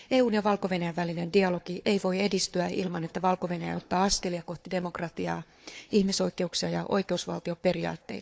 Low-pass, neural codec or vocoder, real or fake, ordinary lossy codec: none; codec, 16 kHz, 4 kbps, FunCodec, trained on Chinese and English, 50 frames a second; fake; none